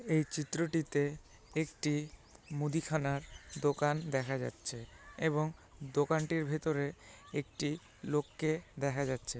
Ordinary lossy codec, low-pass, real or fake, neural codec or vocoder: none; none; real; none